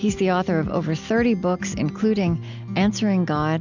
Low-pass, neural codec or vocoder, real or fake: 7.2 kHz; none; real